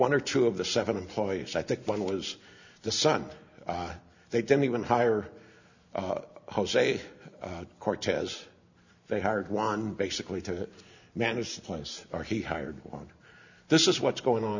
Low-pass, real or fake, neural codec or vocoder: 7.2 kHz; real; none